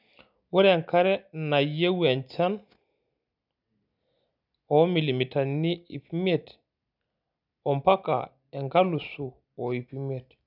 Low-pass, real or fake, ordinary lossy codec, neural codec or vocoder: 5.4 kHz; real; none; none